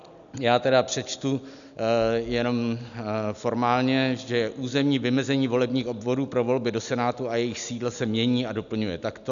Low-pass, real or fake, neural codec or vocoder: 7.2 kHz; real; none